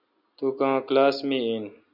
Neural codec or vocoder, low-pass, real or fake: none; 5.4 kHz; real